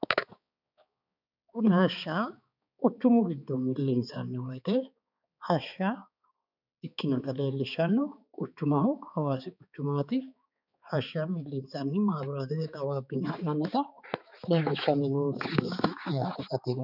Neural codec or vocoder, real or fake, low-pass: codec, 16 kHz, 4 kbps, X-Codec, HuBERT features, trained on balanced general audio; fake; 5.4 kHz